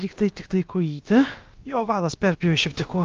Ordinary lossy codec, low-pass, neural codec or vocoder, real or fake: Opus, 32 kbps; 7.2 kHz; codec, 16 kHz, about 1 kbps, DyCAST, with the encoder's durations; fake